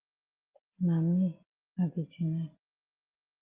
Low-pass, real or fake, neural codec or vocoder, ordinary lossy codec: 3.6 kHz; real; none; Opus, 24 kbps